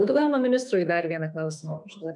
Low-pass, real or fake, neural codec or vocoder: 10.8 kHz; fake; autoencoder, 48 kHz, 32 numbers a frame, DAC-VAE, trained on Japanese speech